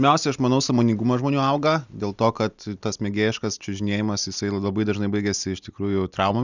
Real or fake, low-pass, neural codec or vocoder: real; 7.2 kHz; none